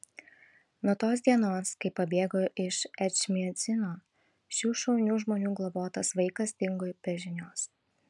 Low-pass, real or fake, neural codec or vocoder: 10.8 kHz; real; none